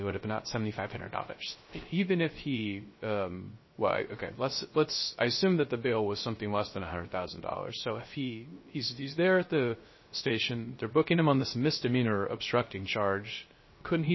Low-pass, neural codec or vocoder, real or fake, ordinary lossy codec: 7.2 kHz; codec, 16 kHz, 0.3 kbps, FocalCodec; fake; MP3, 24 kbps